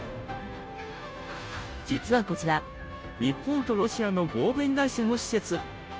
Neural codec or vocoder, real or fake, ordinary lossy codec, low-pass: codec, 16 kHz, 0.5 kbps, FunCodec, trained on Chinese and English, 25 frames a second; fake; none; none